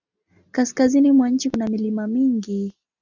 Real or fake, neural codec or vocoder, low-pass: real; none; 7.2 kHz